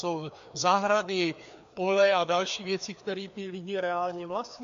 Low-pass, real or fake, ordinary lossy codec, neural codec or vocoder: 7.2 kHz; fake; AAC, 64 kbps; codec, 16 kHz, 2 kbps, FreqCodec, larger model